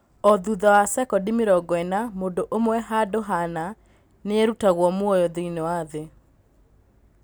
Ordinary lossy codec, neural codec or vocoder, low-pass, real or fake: none; none; none; real